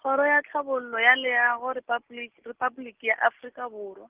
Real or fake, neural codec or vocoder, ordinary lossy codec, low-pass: real; none; Opus, 16 kbps; 3.6 kHz